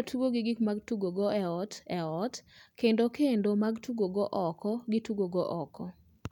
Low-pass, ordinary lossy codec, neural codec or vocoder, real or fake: 19.8 kHz; none; none; real